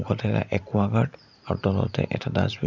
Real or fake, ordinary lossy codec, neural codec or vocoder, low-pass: fake; none; vocoder, 44.1 kHz, 128 mel bands every 512 samples, BigVGAN v2; 7.2 kHz